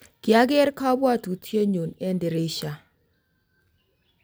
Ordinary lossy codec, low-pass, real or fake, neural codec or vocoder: none; none; real; none